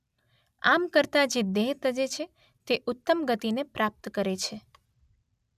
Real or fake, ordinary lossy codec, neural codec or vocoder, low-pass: real; none; none; 14.4 kHz